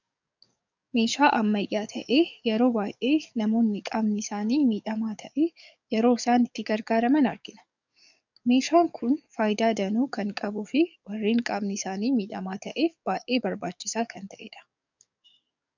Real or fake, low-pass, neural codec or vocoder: fake; 7.2 kHz; codec, 44.1 kHz, 7.8 kbps, DAC